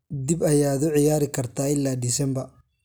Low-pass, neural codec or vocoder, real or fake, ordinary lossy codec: none; none; real; none